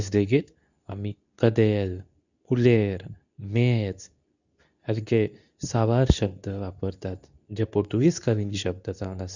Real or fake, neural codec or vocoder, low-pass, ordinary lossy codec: fake; codec, 24 kHz, 0.9 kbps, WavTokenizer, medium speech release version 2; 7.2 kHz; none